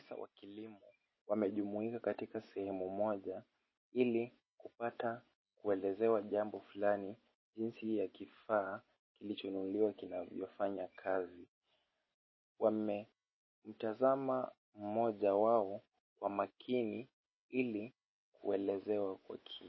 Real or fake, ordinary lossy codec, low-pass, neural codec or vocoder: real; MP3, 24 kbps; 7.2 kHz; none